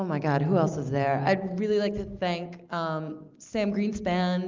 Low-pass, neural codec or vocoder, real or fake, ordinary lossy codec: 7.2 kHz; none; real; Opus, 24 kbps